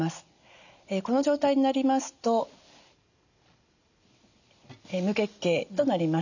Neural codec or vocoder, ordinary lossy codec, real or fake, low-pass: none; none; real; 7.2 kHz